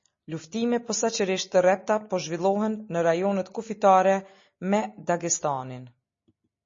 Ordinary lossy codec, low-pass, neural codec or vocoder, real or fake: MP3, 32 kbps; 7.2 kHz; none; real